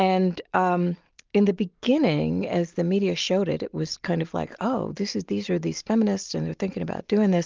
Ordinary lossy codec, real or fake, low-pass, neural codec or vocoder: Opus, 32 kbps; real; 7.2 kHz; none